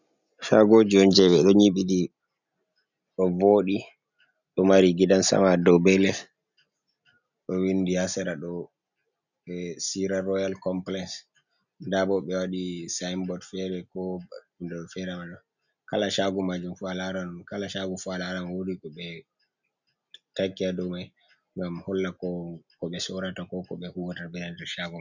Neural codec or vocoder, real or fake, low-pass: none; real; 7.2 kHz